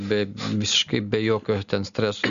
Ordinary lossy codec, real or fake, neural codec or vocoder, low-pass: Opus, 64 kbps; real; none; 7.2 kHz